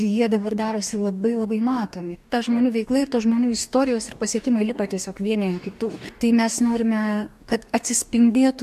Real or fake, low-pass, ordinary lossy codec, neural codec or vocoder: fake; 14.4 kHz; AAC, 96 kbps; codec, 44.1 kHz, 2.6 kbps, DAC